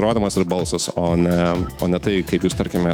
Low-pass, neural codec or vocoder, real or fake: 19.8 kHz; autoencoder, 48 kHz, 128 numbers a frame, DAC-VAE, trained on Japanese speech; fake